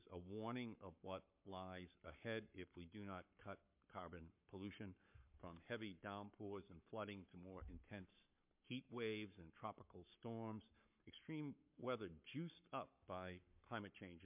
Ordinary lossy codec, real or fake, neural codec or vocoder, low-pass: MP3, 32 kbps; fake; codec, 44.1 kHz, 7.8 kbps, Pupu-Codec; 3.6 kHz